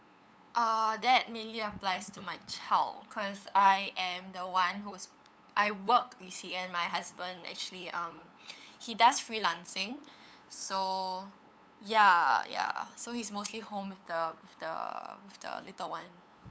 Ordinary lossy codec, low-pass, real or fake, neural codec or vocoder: none; none; fake; codec, 16 kHz, 8 kbps, FunCodec, trained on LibriTTS, 25 frames a second